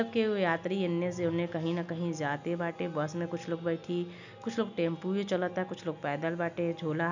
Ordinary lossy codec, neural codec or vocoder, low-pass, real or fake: none; none; 7.2 kHz; real